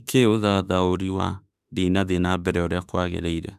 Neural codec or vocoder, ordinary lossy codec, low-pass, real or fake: autoencoder, 48 kHz, 32 numbers a frame, DAC-VAE, trained on Japanese speech; none; 14.4 kHz; fake